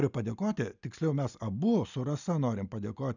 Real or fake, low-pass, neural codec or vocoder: real; 7.2 kHz; none